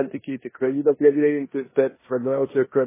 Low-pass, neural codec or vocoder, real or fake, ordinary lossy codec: 3.6 kHz; codec, 16 kHz in and 24 kHz out, 0.9 kbps, LongCat-Audio-Codec, four codebook decoder; fake; MP3, 16 kbps